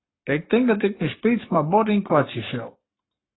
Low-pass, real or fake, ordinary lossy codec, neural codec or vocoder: 7.2 kHz; fake; AAC, 16 kbps; codec, 44.1 kHz, 7.8 kbps, Pupu-Codec